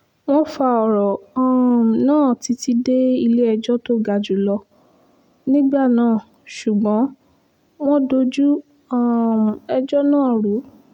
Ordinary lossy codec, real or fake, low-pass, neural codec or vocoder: none; real; 19.8 kHz; none